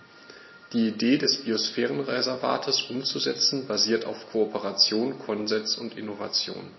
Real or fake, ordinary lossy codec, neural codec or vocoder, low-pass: real; MP3, 24 kbps; none; 7.2 kHz